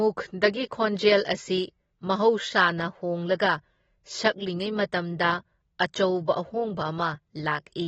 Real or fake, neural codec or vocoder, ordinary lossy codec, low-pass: real; none; AAC, 24 kbps; 9.9 kHz